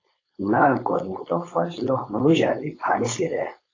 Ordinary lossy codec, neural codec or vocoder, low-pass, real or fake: AAC, 32 kbps; codec, 16 kHz, 4.8 kbps, FACodec; 7.2 kHz; fake